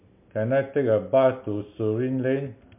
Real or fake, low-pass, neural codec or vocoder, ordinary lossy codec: real; 3.6 kHz; none; MP3, 32 kbps